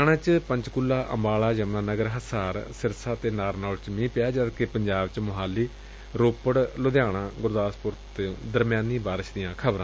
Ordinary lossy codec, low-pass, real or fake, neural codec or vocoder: none; none; real; none